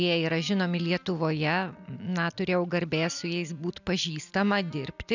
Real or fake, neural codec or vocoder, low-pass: real; none; 7.2 kHz